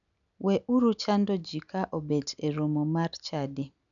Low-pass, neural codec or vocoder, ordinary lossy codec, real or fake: 7.2 kHz; none; none; real